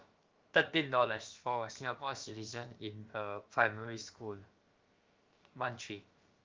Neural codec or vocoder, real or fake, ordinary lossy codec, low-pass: codec, 16 kHz, about 1 kbps, DyCAST, with the encoder's durations; fake; Opus, 32 kbps; 7.2 kHz